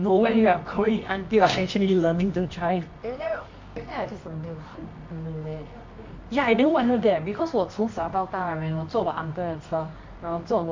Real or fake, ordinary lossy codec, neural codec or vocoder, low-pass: fake; MP3, 48 kbps; codec, 24 kHz, 0.9 kbps, WavTokenizer, medium music audio release; 7.2 kHz